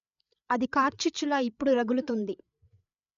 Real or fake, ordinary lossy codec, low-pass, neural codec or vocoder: fake; none; 7.2 kHz; codec, 16 kHz, 8 kbps, FreqCodec, larger model